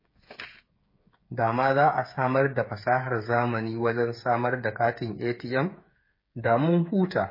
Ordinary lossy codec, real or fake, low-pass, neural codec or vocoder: MP3, 24 kbps; fake; 5.4 kHz; codec, 16 kHz, 8 kbps, FreqCodec, smaller model